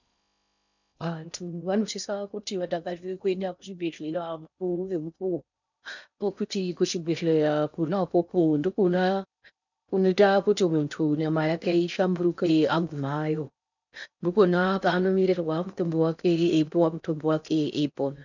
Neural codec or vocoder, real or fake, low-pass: codec, 16 kHz in and 24 kHz out, 0.6 kbps, FocalCodec, streaming, 4096 codes; fake; 7.2 kHz